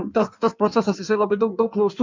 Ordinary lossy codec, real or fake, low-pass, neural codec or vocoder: MP3, 64 kbps; fake; 7.2 kHz; codec, 16 kHz in and 24 kHz out, 1.1 kbps, FireRedTTS-2 codec